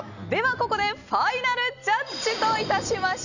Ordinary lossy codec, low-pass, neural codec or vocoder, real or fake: none; 7.2 kHz; none; real